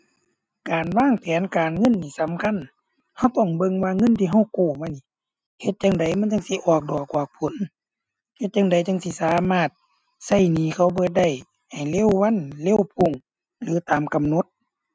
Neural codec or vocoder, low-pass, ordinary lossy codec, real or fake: none; none; none; real